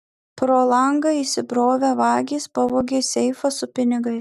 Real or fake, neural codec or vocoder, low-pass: real; none; 14.4 kHz